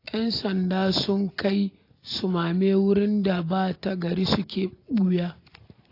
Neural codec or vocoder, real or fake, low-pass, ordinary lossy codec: none; real; 5.4 kHz; AAC, 32 kbps